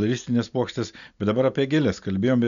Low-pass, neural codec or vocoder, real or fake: 7.2 kHz; none; real